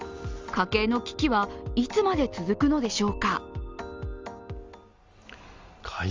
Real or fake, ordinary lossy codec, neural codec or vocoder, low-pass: real; Opus, 32 kbps; none; 7.2 kHz